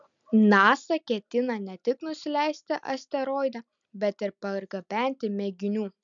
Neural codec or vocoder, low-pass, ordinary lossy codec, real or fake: none; 7.2 kHz; MP3, 96 kbps; real